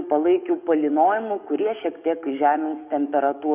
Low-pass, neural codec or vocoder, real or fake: 3.6 kHz; codec, 44.1 kHz, 7.8 kbps, DAC; fake